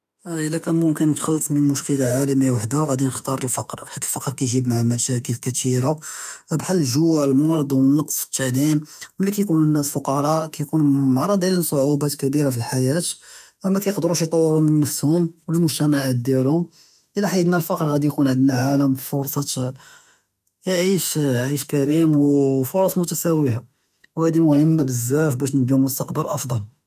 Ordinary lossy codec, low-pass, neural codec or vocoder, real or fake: none; 14.4 kHz; autoencoder, 48 kHz, 32 numbers a frame, DAC-VAE, trained on Japanese speech; fake